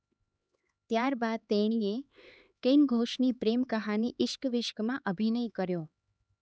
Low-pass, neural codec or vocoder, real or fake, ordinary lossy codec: none; codec, 16 kHz, 4 kbps, X-Codec, HuBERT features, trained on LibriSpeech; fake; none